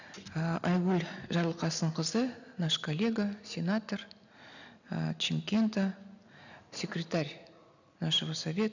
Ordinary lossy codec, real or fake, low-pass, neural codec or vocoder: none; real; 7.2 kHz; none